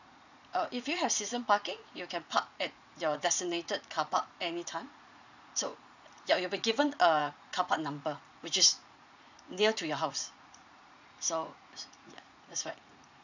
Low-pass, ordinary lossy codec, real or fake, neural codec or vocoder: 7.2 kHz; none; fake; vocoder, 44.1 kHz, 80 mel bands, Vocos